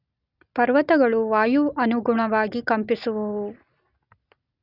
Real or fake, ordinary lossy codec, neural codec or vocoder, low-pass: real; none; none; 5.4 kHz